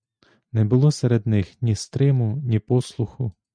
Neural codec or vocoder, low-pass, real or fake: none; 9.9 kHz; real